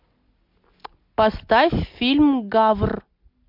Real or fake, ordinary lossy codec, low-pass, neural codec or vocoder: real; MP3, 48 kbps; 5.4 kHz; none